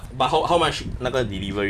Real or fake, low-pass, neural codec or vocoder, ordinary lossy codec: real; 14.4 kHz; none; none